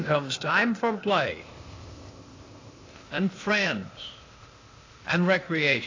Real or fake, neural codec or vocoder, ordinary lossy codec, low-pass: fake; codec, 16 kHz, 0.8 kbps, ZipCodec; AAC, 32 kbps; 7.2 kHz